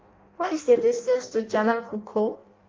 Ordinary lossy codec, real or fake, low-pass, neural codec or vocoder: Opus, 32 kbps; fake; 7.2 kHz; codec, 16 kHz in and 24 kHz out, 0.6 kbps, FireRedTTS-2 codec